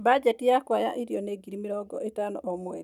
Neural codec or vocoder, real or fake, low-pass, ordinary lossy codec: none; real; 19.8 kHz; none